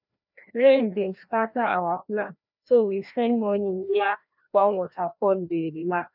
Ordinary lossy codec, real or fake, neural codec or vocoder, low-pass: none; fake; codec, 16 kHz, 1 kbps, FreqCodec, larger model; 5.4 kHz